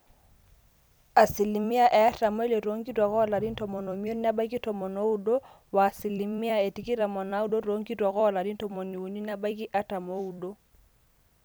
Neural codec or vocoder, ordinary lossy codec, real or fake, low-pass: vocoder, 44.1 kHz, 128 mel bands every 256 samples, BigVGAN v2; none; fake; none